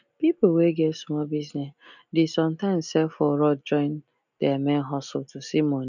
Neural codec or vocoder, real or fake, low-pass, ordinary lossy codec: none; real; 7.2 kHz; none